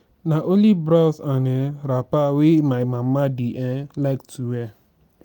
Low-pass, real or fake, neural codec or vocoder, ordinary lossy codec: 19.8 kHz; real; none; none